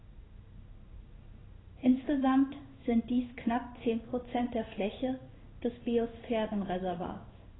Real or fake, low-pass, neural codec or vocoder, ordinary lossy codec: fake; 7.2 kHz; codec, 16 kHz in and 24 kHz out, 1 kbps, XY-Tokenizer; AAC, 16 kbps